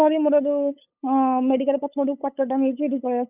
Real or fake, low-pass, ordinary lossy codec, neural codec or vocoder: fake; 3.6 kHz; none; codec, 16 kHz, 8 kbps, FunCodec, trained on LibriTTS, 25 frames a second